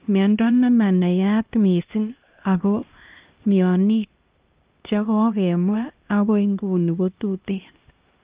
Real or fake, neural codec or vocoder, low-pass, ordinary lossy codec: fake; codec, 16 kHz, 1 kbps, X-Codec, HuBERT features, trained on LibriSpeech; 3.6 kHz; Opus, 32 kbps